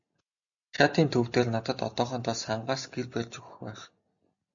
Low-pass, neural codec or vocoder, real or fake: 7.2 kHz; none; real